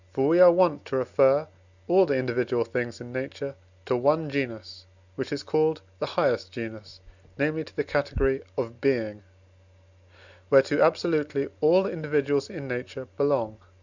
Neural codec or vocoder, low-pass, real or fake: none; 7.2 kHz; real